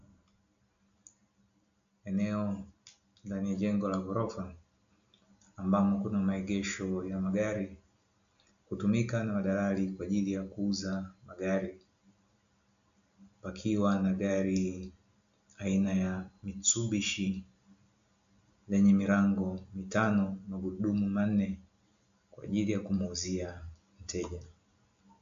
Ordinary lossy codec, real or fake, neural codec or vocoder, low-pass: AAC, 64 kbps; real; none; 7.2 kHz